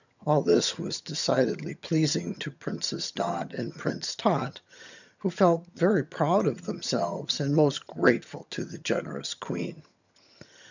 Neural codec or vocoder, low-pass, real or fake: vocoder, 22.05 kHz, 80 mel bands, HiFi-GAN; 7.2 kHz; fake